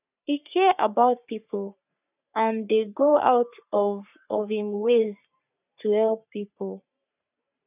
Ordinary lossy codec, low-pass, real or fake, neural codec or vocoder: none; 3.6 kHz; fake; codec, 44.1 kHz, 3.4 kbps, Pupu-Codec